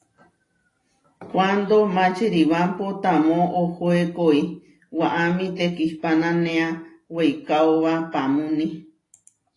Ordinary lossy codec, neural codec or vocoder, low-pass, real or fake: AAC, 32 kbps; none; 10.8 kHz; real